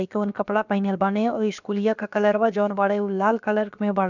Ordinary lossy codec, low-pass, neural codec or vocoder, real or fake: none; 7.2 kHz; codec, 16 kHz, about 1 kbps, DyCAST, with the encoder's durations; fake